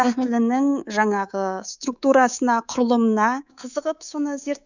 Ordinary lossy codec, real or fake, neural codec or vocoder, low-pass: none; fake; codec, 24 kHz, 3.1 kbps, DualCodec; 7.2 kHz